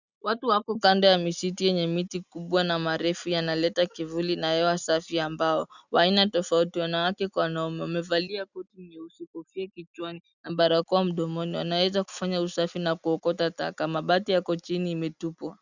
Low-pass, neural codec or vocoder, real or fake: 7.2 kHz; none; real